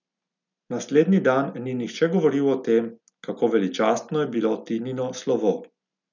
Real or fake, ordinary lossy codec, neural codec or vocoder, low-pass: real; none; none; 7.2 kHz